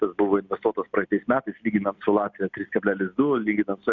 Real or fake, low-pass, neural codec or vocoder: real; 7.2 kHz; none